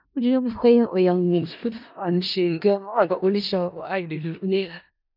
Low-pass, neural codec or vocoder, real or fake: 5.4 kHz; codec, 16 kHz in and 24 kHz out, 0.4 kbps, LongCat-Audio-Codec, four codebook decoder; fake